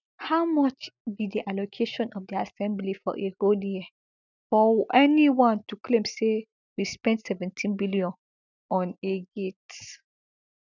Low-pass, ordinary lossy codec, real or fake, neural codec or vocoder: 7.2 kHz; none; real; none